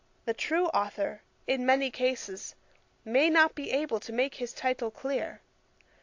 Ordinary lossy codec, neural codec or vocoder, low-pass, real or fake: AAC, 48 kbps; none; 7.2 kHz; real